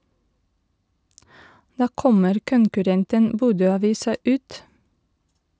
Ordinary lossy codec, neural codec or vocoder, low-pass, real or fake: none; none; none; real